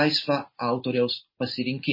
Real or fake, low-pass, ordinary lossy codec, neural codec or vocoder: real; 5.4 kHz; MP3, 24 kbps; none